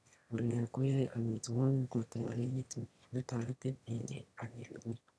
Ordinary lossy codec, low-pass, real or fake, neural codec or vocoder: none; none; fake; autoencoder, 22.05 kHz, a latent of 192 numbers a frame, VITS, trained on one speaker